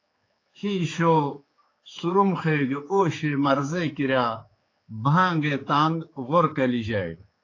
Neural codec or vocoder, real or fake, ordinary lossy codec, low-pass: codec, 16 kHz, 4 kbps, X-Codec, HuBERT features, trained on general audio; fake; AAC, 32 kbps; 7.2 kHz